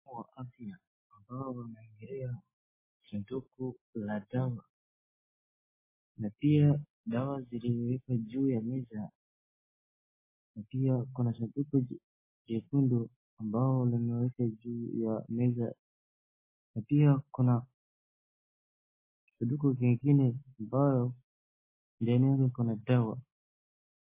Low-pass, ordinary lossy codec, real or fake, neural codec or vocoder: 3.6 kHz; MP3, 16 kbps; real; none